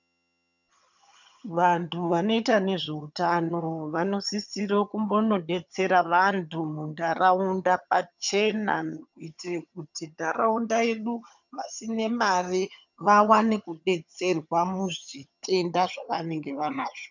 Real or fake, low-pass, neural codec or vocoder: fake; 7.2 kHz; vocoder, 22.05 kHz, 80 mel bands, HiFi-GAN